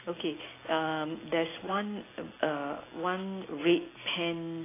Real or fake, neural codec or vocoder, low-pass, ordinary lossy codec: real; none; 3.6 kHz; AAC, 16 kbps